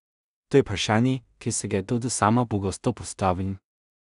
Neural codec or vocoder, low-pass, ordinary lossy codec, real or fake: codec, 16 kHz in and 24 kHz out, 0.4 kbps, LongCat-Audio-Codec, two codebook decoder; 10.8 kHz; none; fake